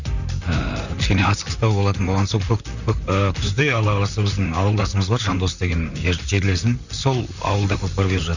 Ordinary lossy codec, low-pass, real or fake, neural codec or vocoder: none; 7.2 kHz; fake; vocoder, 44.1 kHz, 128 mel bands, Pupu-Vocoder